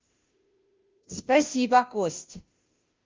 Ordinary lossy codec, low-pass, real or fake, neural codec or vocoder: Opus, 32 kbps; 7.2 kHz; fake; codec, 16 kHz, 0.5 kbps, FunCodec, trained on Chinese and English, 25 frames a second